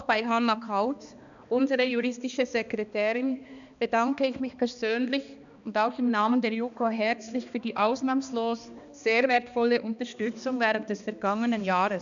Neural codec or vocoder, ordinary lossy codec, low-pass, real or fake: codec, 16 kHz, 2 kbps, X-Codec, HuBERT features, trained on balanced general audio; none; 7.2 kHz; fake